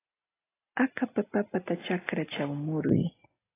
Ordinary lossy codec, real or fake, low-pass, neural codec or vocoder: AAC, 16 kbps; real; 3.6 kHz; none